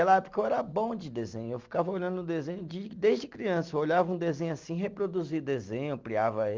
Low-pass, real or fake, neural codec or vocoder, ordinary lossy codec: 7.2 kHz; real; none; Opus, 32 kbps